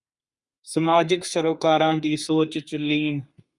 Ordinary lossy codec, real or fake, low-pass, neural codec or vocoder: Opus, 64 kbps; fake; 10.8 kHz; codec, 32 kHz, 1.9 kbps, SNAC